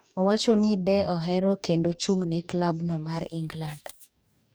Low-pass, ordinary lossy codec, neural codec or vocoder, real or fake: none; none; codec, 44.1 kHz, 2.6 kbps, DAC; fake